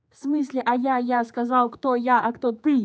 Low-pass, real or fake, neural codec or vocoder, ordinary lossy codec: none; fake; codec, 16 kHz, 4 kbps, X-Codec, HuBERT features, trained on general audio; none